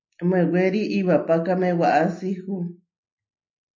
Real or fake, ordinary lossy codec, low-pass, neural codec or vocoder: real; MP3, 48 kbps; 7.2 kHz; none